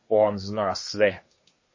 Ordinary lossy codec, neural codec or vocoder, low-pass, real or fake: MP3, 32 kbps; codec, 24 kHz, 1 kbps, SNAC; 7.2 kHz; fake